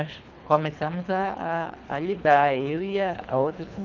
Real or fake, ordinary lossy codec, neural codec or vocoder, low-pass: fake; none; codec, 24 kHz, 3 kbps, HILCodec; 7.2 kHz